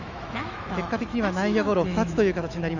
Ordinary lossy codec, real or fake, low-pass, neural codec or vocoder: none; fake; 7.2 kHz; autoencoder, 48 kHz, 128 numbers a frame, DAC-VAE, trained on Japanese speech